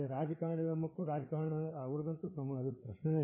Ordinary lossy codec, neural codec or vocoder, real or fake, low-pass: MP3, 16 kbps; codec, 16 kHz, 4 kbps, FreqCodec, larger model; fake; 3.6 kHz